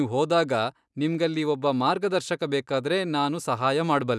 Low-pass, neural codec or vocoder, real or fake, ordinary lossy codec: none; none; real; none